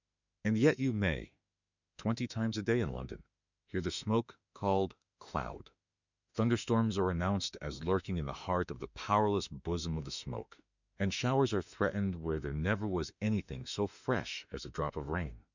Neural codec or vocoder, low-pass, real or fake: autoencoder, 48 kHz, 32 numbers a frame, DAC-VAE, trained on Japanese speech; 7.2 kHz; fake